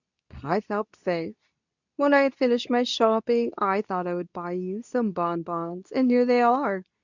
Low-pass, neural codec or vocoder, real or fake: 7.2 kHz; codec, 24 kHz, 0.9 kbps, WavTokenizer, medium speech release version 2; fake